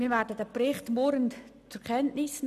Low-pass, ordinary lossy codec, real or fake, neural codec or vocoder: 14.4 kHz; none; real; none